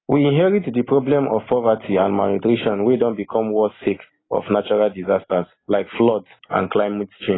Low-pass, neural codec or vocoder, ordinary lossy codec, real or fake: 7.2 kHz; none; AAC, 16 kbps; real